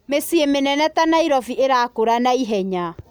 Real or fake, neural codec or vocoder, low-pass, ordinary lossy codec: real; none; none; none